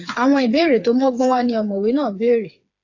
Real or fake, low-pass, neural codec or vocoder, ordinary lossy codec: fake; 7.2 kHz; codec, 16 kHz, 4 kbps, FreqCodec, smaller model; AAC, 48 kbps